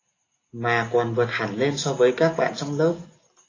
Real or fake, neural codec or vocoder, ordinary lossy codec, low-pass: real; none; AAC, 32 kbps; 7.2 kHz